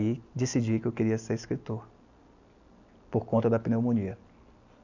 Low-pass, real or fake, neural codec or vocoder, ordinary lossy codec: 7.2 kHz; real; none; none